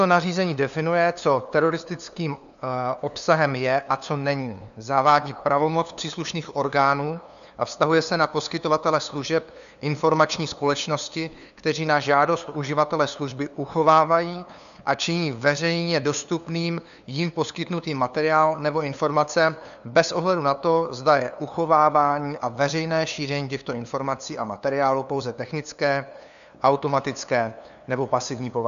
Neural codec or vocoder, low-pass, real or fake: codec, 16 kHz, 2 kbps, FunCodec, trained on LibriTTS, 25 frames a second; 7.2 kHz; fake